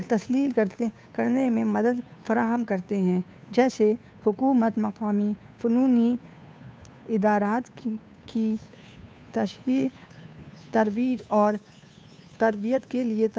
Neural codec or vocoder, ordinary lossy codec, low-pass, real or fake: codec, 16 kHz, 2 kbps, FunCodec, trained on Chinese and English, 25 frames a second; none; none; fake